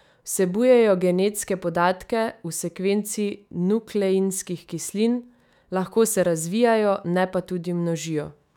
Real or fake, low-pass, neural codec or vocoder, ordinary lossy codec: fake; 19.8 kHz; autoencoder, 48 kHz, 128 numbers a frame, DAC-VAE, trained on Japanese speech; none